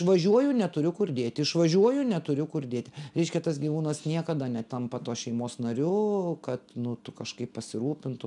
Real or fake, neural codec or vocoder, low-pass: real; none; 10.8 kHz